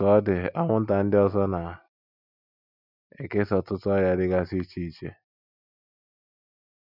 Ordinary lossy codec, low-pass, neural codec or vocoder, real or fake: none; 5.4 kHz; none; real